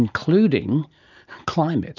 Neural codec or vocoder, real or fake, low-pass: none; real; 7.2 kHz